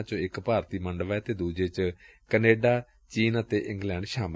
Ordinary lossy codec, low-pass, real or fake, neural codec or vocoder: none; none; real; none